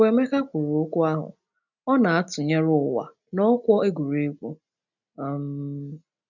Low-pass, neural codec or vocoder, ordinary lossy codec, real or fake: 7.2 kHz; none; none; real